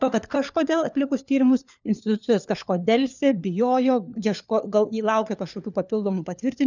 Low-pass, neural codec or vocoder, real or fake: 7.2 kHz; codec, 16 kHz, 4 kbps, FunCodec, trained on LibriTTS, 50 frames a second; fake